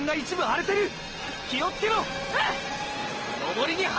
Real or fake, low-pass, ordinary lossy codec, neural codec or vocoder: real; 7.2 kHz; Opus, 16 kbps; none